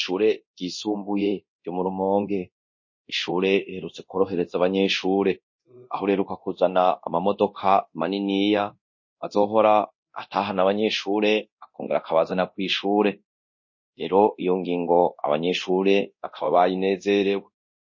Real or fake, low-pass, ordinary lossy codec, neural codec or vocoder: fake; 7.2 kHz; MP3, 32 kbps; codec, 24 kHz, 0.9 kbps, DualCodec